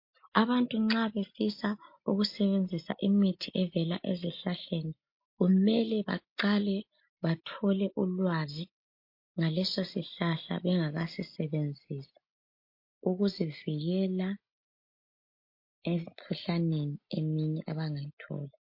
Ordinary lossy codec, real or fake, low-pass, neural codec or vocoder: MP3, 32 kbps; real; 5.4 kHz; none